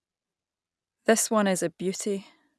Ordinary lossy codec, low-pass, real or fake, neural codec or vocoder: none; none; real; none